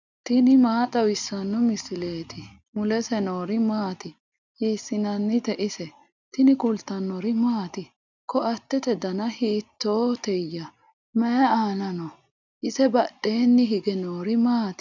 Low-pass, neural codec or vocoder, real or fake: 7.2 kHz; none; real